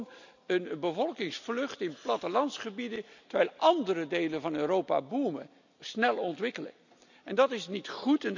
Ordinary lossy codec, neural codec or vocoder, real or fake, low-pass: none; none; real; 7.2 kHz